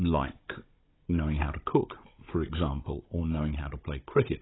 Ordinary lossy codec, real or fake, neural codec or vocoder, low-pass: AAC, 16 kbps; fake; codec, 16 kHz, 16 kbps, FunCodec, trained on Chinese and English, 50 frames a second; 7.2 kHz